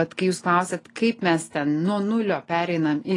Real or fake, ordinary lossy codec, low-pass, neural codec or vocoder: real; AAC, 32 kbps; 10.8 kHz; none